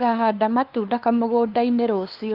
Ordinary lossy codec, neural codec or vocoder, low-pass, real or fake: Opus, 32 kbps; codec, 16 kHz, 2 kbps, FunCodec, trained on LibriTTS, 25 frames a second; 5.4 kHz; fake